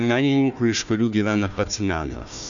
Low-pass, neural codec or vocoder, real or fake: 7.2 kHz; codec, 16 kHz, 1 kbps, FunCodec, trained on Chinese and English, 50 frames a second; fake